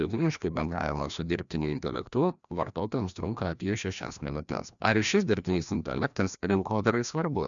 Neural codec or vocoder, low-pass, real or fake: codec, 16 kHz, 1 kbps, FreqCodec, larger model; 7.2 kHz; fake